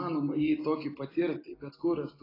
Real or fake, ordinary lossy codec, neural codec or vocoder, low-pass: real; AAC, 24 kbps; none; 5.4 kHz